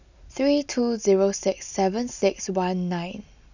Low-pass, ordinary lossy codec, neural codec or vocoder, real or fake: 7.2 kHz; none; none; real